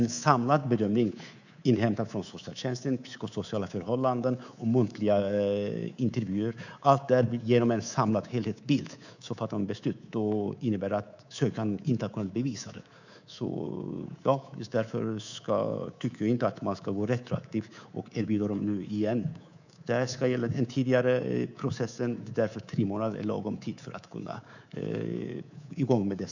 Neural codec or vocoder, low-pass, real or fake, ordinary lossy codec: codec, 24 kHz, 3.1 kbps, DualCodec; 7.2 kHz; fake; none